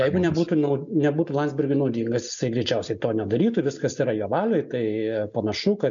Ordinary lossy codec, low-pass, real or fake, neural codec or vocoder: MP3, 64 kbps; 7.2 kHz; real; none